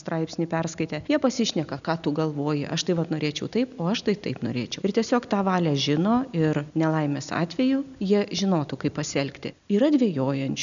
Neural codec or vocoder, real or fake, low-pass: none; real; 7.2 kHz